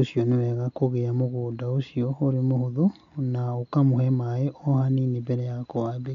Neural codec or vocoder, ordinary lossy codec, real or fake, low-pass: none; none; real; 7.2 kHz